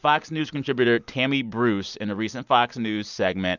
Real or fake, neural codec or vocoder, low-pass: real; none; 7.2 kHz